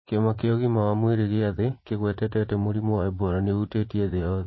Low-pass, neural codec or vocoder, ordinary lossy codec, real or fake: 7.2 kHz; vocoder, 44.1 kHz, 80 mel bands, Vocos; MP3, 24 kbps; fake